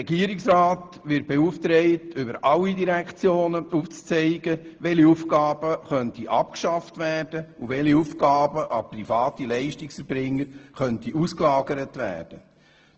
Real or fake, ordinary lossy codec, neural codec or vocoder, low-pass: real; Opus, 16 kbps; none; 7.2 kHz